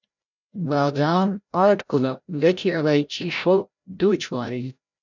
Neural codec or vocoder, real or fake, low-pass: codec, 16 kHz, 0.5 kbps, FreqCodec, larger model; fake; 7.2 kHz